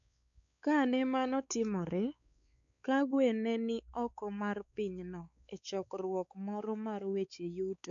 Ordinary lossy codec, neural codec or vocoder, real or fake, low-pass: none; codec, 16 kHz, 4 kbps, X-Codec, WavLM features, trained on Multilingual LibriSpeech; fake; 7.2 kHz